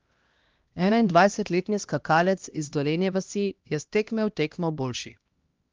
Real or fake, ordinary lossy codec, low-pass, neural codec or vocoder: fake; Opus, 32 kbps; 7.2 kHz; codec, 16 kHz, 1 kbps, X-Codec, HuBERT features, trained on LibriSpeech